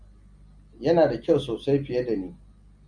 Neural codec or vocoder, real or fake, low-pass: none; real; 9.9 kHz